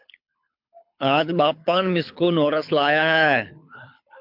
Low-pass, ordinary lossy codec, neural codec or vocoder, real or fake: 5.4 kHz; MP3, 48 kbps; codec, 24 kHz, 6 kbps, HILCodec; fake